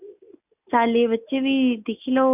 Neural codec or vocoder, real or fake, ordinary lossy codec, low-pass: none; real; none; 3.6 kHz